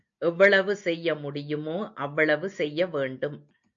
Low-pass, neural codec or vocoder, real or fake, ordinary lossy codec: 7.2 kHz; none; real; AAC, 64 kbps